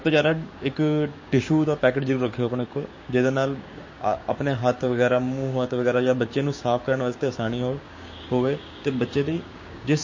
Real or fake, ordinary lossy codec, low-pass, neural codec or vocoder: fake; MP3, 32 kbps; 7.2 kHz; codec, 16 kHz, 6 kbps, DAC